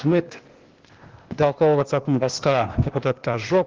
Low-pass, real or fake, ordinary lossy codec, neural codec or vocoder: 7.2 kHz; fake; Opus, 16 kbps; codec, 16 kHz, 0.8 kbps, ZipCodec